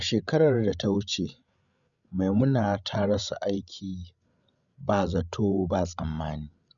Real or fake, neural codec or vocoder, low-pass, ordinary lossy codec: fake; codec, 16 kHz, 16 kbps, FreqCodec, larger model; 7.2 kHz; none